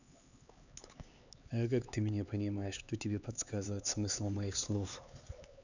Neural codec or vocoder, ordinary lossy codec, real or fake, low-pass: codec, 16 kHz, 4 kbps, X-Codec, HuBERT features, trained on LibriSpeech; none; fake; 7.2 kHz